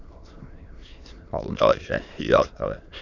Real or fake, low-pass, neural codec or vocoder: fake; 7.2 kHz; autoencoder, 22.05 kHz, a latent of 192 numbers a frame, VITS, trained on many speakers